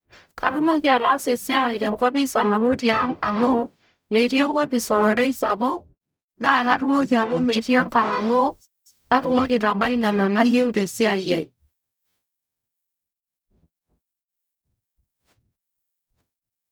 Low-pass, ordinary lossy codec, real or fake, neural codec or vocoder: none; none; fake; codec, 44.1 kHz, 0.9 kbps, DAC